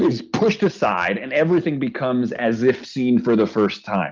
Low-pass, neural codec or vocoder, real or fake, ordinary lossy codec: 7.2 kHz; none; real; Opus, 24 kbps